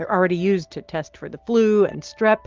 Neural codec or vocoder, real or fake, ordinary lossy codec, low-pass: none; real; Opus, 32 kbps; 7.2 kHz